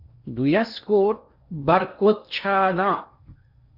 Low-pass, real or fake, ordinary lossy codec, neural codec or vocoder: 5.4 kHz; fake; Opus, 64 kbps; codec, 16 kHz in and 24 kHz out, 0.8 kbps, FocalCodec, streaming, 65536 codes